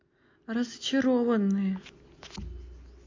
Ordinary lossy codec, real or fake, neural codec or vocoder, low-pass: MP3, 48 kbps; real; none; 7.2 kHz